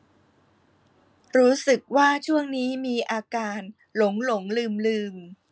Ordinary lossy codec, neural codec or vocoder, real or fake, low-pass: none; none; real; none